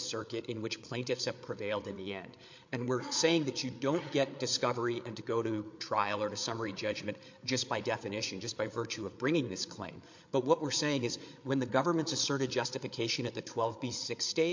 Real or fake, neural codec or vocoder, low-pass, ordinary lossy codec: fake; codec, 16 kHz, 8 kbps, FreqCodec, larger model; 7.2 kHz; MP3, 48 kbps